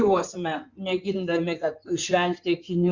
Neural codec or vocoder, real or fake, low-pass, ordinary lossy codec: codec, 16 kHz in and 24 kHz out, 2.2 kbps, FireRedTTS-2 codec; fake; 7.2 kHz; Opus, 64 kbps